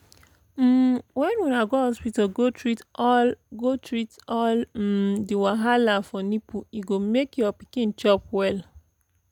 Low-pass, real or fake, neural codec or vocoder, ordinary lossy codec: 19.8 kHz; real; none; none